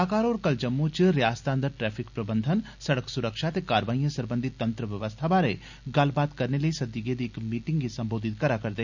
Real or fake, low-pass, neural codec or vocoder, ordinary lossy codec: real; 7.2 kHz; none; none